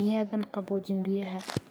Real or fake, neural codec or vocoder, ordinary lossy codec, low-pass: fake; codec, 44.1 kHz, 3.4 kbps, Pupu-Codec; none; none